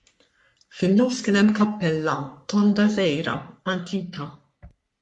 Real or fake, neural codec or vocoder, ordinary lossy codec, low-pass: fake; codec, 44.1 kHz, 3.4 kbps, Pupu-Codec; MP3, 64 kbps; 10.8 kHz